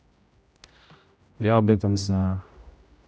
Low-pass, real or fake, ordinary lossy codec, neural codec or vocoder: none; fake; none; codec, 16 kHz, 0.5 kbps, X-Codec, HuBERT features, trained on general audio